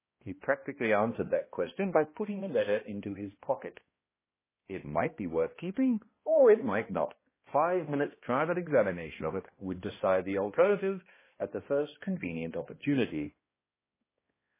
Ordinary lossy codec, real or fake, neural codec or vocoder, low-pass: MP3, 16 kbps; fake; codec, 16 kHz, 1 kbps, X-Codec, HuBERT features, trained on balanced general audio; 3.6 kHz